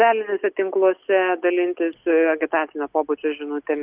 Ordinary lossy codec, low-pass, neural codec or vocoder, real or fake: Opus, 32 kbps; 3.6 kHz; none; real